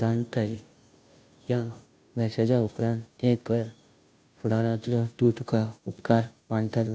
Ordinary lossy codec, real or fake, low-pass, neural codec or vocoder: none; fake; none; codec, 16 kHz, 0.5 kbps, FunCodec, trained on Chinese and English, 25 frames a second